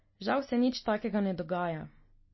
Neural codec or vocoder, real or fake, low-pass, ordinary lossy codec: none; real; 7.2 kHz; MP3, 24 kbps